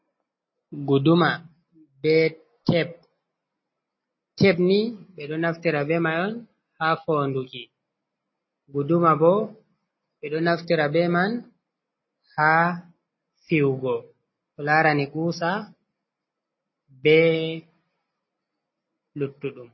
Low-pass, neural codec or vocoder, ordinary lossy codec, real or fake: 7.2 kHz; none; MP3, 24 kbps; real